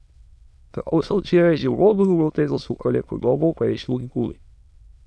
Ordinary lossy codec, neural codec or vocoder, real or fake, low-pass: none; autoencoder, 22.05 kHz, a latent of 192 numbers a frame, VITS, trained on many speakers; fake; none